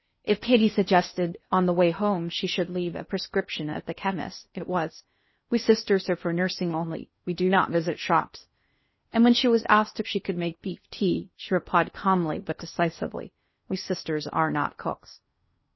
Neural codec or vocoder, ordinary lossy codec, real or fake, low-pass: codec, 16 kHz in and 24 kHz out, 0.6 kbps, FocalCodec, streaming, 4096 codes; MP3, 24 kbps; fake; 7.2 kHz